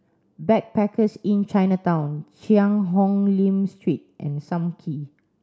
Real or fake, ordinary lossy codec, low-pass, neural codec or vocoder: real; none; none; none